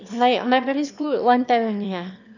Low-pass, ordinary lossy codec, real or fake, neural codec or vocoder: 7.2 kHz; none; fake; autoencoder, 22.05 kHz, a latent of 192 numbers a frame, VITS, trained on one speaker